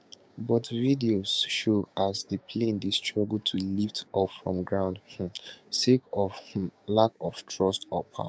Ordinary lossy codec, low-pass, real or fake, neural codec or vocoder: none; none; fake; codec, 16 kHz, 6 kbps, DAC